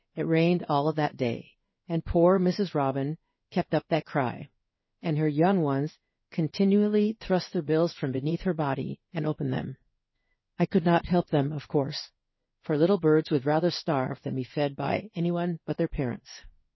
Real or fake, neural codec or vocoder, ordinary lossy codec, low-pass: fake; codec, 16 kHz in and 24 kHz out, 1 kbps, XY-Tokenizer; MP3, 24 kbps; 7.2 kHz